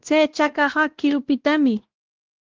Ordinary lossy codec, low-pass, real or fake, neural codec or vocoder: Opus, 16 kbps; 7.2 kHz; fake; codec, 16 kHz, 1 kbps, X-Codec, WavLM features, trained on Multilingual LibriSpeech